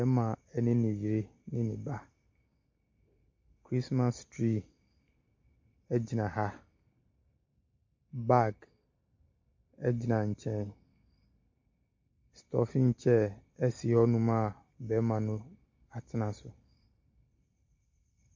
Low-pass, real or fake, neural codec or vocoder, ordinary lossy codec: 7.2 kHz; real; none; MP3, 48 kbps